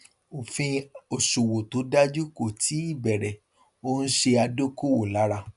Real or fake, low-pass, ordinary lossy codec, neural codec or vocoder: real; 10.8 kHz; none; none